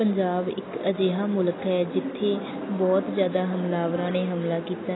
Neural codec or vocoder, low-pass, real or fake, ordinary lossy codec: none; 7.2 kHz; real; AAC, 16 kbps